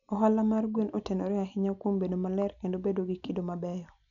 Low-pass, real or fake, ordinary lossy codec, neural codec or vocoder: 7.2 kHz; real; none; none